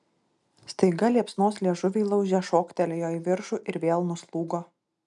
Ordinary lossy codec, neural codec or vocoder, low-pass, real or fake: MP3, 96 kbps; none; 10.8 kHz; real